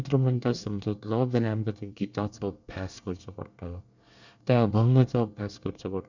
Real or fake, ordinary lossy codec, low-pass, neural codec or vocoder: fake; none; 7.2 kHz; codec, 24 kHz, 1 kbps, SNAC